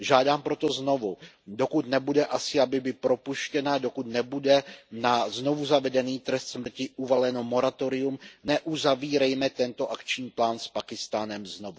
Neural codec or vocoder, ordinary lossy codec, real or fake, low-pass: none; none; real; none